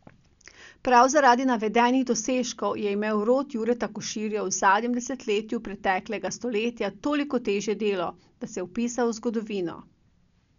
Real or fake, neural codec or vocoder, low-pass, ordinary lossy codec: real; none; 7.2 kHz; none